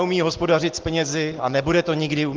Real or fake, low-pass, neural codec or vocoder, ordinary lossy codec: real; 7.2 kHz; none; Opus, 16 kbps